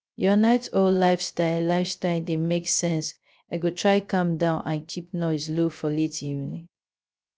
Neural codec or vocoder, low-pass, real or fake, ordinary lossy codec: codec, 16 kHz, 0.3 kbps, FocalCodec; none; fake; none